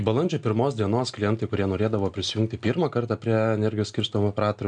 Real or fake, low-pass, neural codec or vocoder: real; 9.9 kHz; none